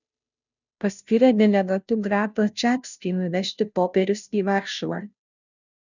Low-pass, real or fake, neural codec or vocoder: 7.2 kHz; fake; codec, 16 kHz, 0.5 kbps, FunCodec, trained on Chinese and English, 25 frames a second